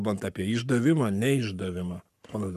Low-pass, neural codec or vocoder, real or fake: 14.4 kHz; codec, 44.1 kHz, 7.8 kbps, Pupu-Codec; fake